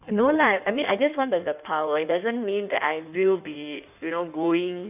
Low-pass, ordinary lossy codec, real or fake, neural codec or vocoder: 3.6 kHz; none; fake; codec, 16 kHz in and 24 kHz out, 1.1 kbps, FireRedTTS-2 codec